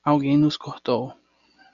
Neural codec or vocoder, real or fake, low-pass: none; real; 7.2 kHz